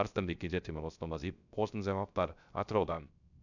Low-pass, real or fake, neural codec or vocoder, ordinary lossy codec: 7.2 kHz; fake; codec, 16 kHz, 0.3 kbps, FocalCodec; none